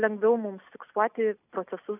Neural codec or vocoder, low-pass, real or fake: none; 3.6 kHz; real